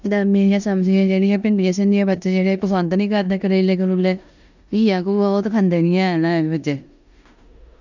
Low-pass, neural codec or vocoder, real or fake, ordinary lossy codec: 7.2 kHz; codec, 16 kHz in and 24 kHz out, 0.9 kbps, LongCat-Audio-Codec, four codebook decoder; fake; none